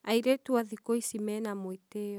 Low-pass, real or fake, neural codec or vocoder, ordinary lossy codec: none; real; none; none